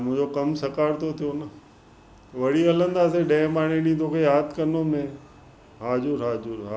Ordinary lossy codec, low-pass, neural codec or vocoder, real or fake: none; none; none; real